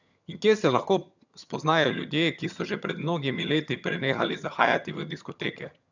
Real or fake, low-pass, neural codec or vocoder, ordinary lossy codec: fake; 7.2 kHz; vocoder, 22.05 kHz, 80 mel bands, HiFi-GAN; none